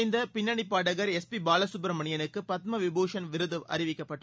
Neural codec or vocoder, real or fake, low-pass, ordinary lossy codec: none; real; none; none